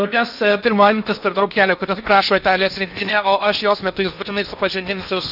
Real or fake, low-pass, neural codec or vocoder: fake; 5.4 kHz; codec, 16 kHz in and 24 kHz out, 0.8 kbps, FocalCodec, streaming, 65536 codes